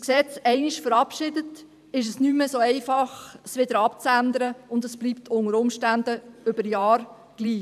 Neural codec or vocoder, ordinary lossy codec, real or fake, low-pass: vocoder, 44.1 kHz, 128 mel bands every 512 samples, BigVGAN v2; none; fake; 14.4 kHz